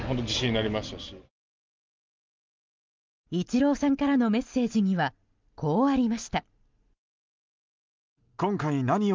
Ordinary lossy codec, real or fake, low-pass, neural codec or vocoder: Opus, 24 kbps; real; 7.2 kHz; none